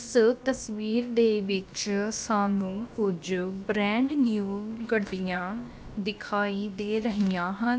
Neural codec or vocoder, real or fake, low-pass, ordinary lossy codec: codec, 16 kHz, about 1 kbps, DyCAST, with the encoder's durations; fake; none; none